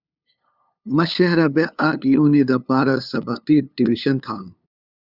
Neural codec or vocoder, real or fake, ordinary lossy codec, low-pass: codec, 16 kHz, 2 kbps, FunCodec, trained on LibriTTS, 25 frames a second; fake; Opus, 64 kbps; 5.4 kHz